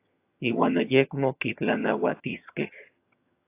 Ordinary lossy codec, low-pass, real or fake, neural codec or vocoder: AAC, 24 kbps; 3.6 kHz; fake; vocoder, 22.05 kHz, 80 mel bands, HiFi-GAN